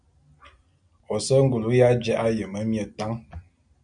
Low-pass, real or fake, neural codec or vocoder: 9.9 kHz; real; none